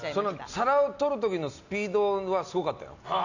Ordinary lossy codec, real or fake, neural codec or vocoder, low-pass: none; real; none; 7.2 kHz